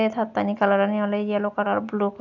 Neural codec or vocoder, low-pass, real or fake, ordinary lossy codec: none; 7.2 kHz; real; none